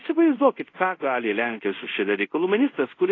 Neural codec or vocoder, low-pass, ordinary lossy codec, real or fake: codec, 24 kHz, 0.5 kbps, DualCodec; 7.2 kHz; AAC, 32 kbps; fake